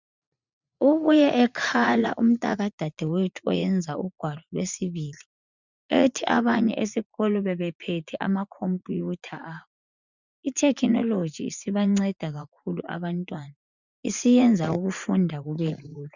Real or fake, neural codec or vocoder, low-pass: fake; vocoder, 44.1 kHz, 80 mel bands, Vocos; 7.2 kHz